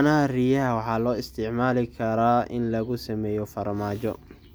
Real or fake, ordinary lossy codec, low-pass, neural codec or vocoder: real; none; none; none